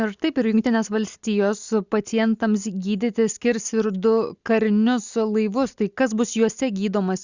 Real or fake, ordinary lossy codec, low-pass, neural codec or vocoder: real; Opus, 64 kbps; 7.2 kHz; none